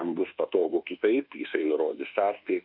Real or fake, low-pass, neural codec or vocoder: fake; 5.4 kHz; codec, 24 kHz, 1.2 kbps, DualCodec